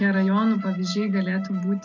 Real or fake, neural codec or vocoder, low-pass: real; none; 7.2 kHz